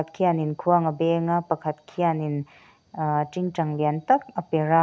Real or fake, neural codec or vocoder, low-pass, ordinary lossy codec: real; none; none; none